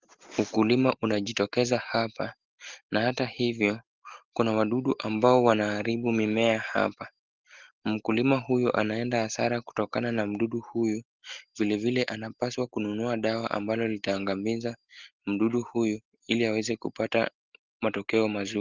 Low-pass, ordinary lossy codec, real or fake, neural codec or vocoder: 7.2 kHz; Opus, 16 kbps; real; none